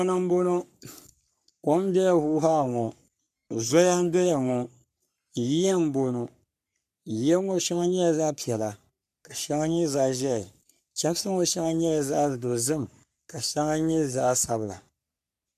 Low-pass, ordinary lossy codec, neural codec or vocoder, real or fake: 14.4 kHz; MP3, 96 kbps; codec, 44.1 kHz, 3.4 kbps, Pupu-Codec; fake